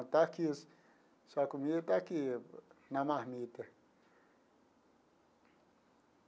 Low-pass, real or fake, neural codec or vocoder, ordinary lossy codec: none; real; none; none